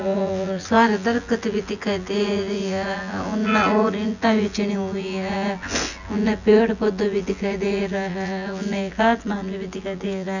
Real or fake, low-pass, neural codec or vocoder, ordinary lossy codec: fake; 7.2 kHz; vocoder, 24 kHz, 100 mel bands, Vocos; none